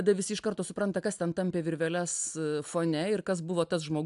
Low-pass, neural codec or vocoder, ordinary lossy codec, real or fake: 10.8 kHz; none; AAC, 96 kbps; real